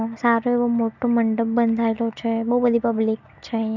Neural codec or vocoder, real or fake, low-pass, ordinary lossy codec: none; real; 7.2 kHz; none